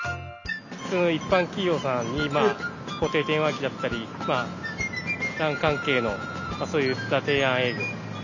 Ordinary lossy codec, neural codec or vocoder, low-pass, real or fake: none; none; 7.2 kHz; real